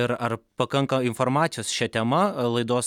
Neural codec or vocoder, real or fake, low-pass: none; real; 19.8 kHz